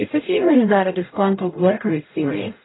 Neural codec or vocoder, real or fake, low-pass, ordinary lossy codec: codec, 44.1 kHz, 0.9 kbps, DAC; fake; 7.2 kHz; AAC, 16 kbps